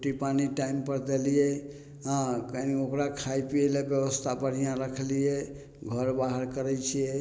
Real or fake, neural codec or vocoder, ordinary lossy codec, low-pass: real; none; none; none